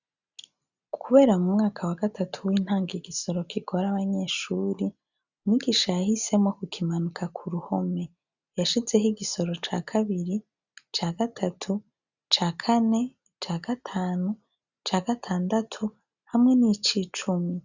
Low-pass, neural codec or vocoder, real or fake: 7.2 kHz; none; real